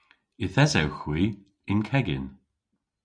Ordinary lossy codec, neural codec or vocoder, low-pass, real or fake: MP3, 96 kbps; none; 9.9 kHz; real